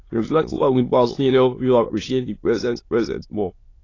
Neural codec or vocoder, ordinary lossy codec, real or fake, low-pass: autoencoder, 22.05 kHz, a latent of 192 numbers a frame, VITS, trained on many speakers; AAC, 32 kbps; fake; 7.2 kHz